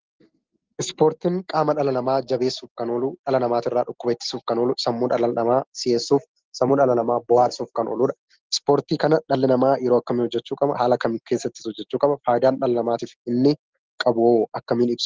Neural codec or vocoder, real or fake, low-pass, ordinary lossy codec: none; real; 7.2 kHz; Opus, 16 kbps